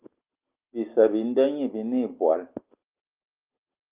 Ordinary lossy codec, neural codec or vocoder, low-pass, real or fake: Opus, 32 kbps; none; 3.6 kHz; real